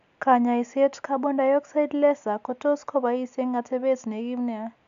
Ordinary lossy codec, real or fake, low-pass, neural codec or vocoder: none; real; 7.2 kHz; none